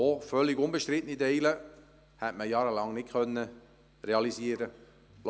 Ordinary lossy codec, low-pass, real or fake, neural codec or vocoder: none; none; real; none